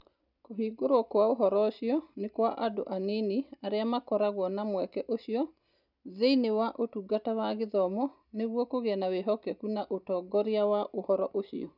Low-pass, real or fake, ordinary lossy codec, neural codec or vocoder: 5.4 kHz; real; none; none